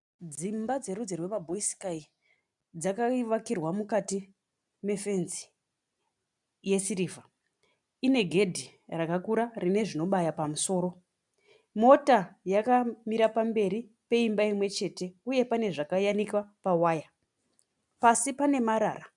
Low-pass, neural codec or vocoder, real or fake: 10.8 kHz; none; real